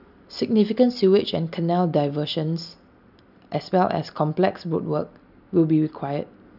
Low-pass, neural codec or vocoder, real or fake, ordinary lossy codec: 5.4 kHz; none; real; none